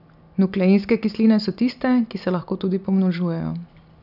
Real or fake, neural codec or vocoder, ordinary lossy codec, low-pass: real; none; none; 5.4 kHz